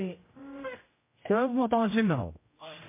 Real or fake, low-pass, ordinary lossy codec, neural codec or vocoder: fake; 3.6 kHz; MP3, 24 kbps; codec, 16 kHz, 0.5 kbps, X-Codec, HuBERT features, trained on general audio